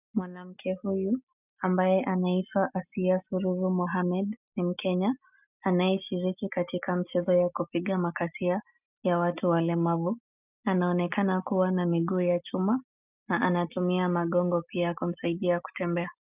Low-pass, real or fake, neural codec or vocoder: 3.6 kHz; real; none